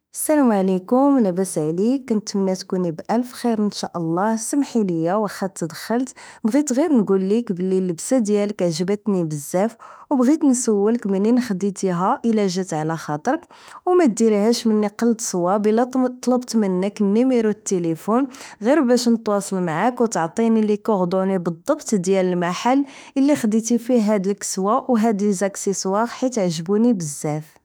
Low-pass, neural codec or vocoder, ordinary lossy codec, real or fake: none; autoencoder, 48 kHz, 32 numbers a frame, DAC-VAE, trained on Japanese speech; none; fake